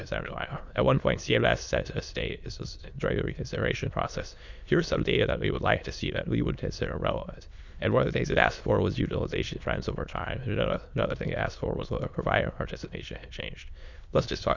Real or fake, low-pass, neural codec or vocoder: fake; 7.2 kHz; autoencoder, 22.05 kHz, a latent of 192 numbers a frame, VITS, trained on many speakers